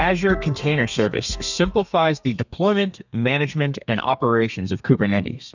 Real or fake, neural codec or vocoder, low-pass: fake; codec, 32 kHz, 1.9 kbps, SNAC; 7.2 kHz